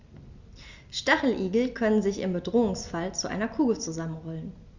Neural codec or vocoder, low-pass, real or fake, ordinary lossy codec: none; 7.2 kHz; real; Opus, 64 kbps